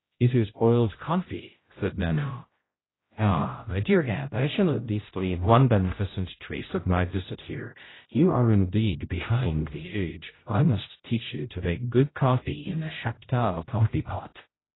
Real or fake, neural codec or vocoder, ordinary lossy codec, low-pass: fake; codec, 16 kHz, 0.5 kbps, X-Codec, HuBERT features, trained on general audio; AAC, 16 kbps; 7.2 kHz